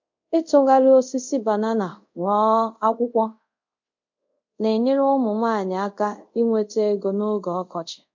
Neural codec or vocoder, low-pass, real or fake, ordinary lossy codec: codec, 24 kHz, 0.5 kbps, DualCodec; 7.2 kHz; fake; MP3, 48 kbps